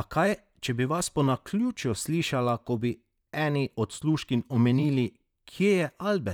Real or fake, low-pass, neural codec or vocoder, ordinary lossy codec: fake; 19.8 kHz; vocoder, 44.1 kHz, 128 mel bands, Pupu-Vocoder; none